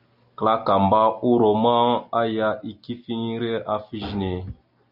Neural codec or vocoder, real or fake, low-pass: none; real; 5.4 kHz